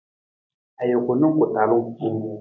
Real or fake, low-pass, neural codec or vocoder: real; 7.2 kHz; none